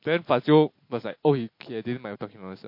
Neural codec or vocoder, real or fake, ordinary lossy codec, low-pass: none; real; MP3, 32 kbps; 5.4 kHz